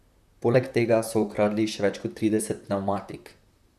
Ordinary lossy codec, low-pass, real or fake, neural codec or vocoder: none; 14.4 kHz; fake; vocoder, 44.1 kHz, 128 mel bands, Pupu-Vocoder